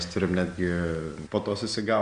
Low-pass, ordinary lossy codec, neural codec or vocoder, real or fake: 9.9 kHz; Opus, 64 kbps; none; real